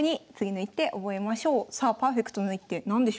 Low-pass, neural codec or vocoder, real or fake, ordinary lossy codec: none; none; real; none